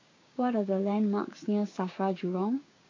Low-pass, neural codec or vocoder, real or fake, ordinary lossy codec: 7.2 kHz; codec, 16 kHz, 6 kbps, DAC; fake; AAC, 32 kbps